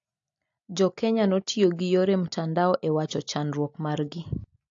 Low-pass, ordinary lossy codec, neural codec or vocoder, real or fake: 7.2 kHz; AAC, 48 kbps; none; real